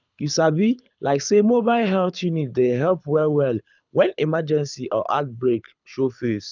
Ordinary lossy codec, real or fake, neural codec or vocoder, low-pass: none; fake; codec, 24 kHz, 6 kbps, HILCodec; 7.2 kHz